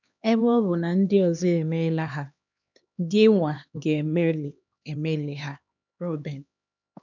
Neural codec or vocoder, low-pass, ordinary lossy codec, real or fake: codec, 16 kHz, 2 kbps, X-Codec, HuBERT features, trained on LibriSpeech; 7.2 kHz; none; fake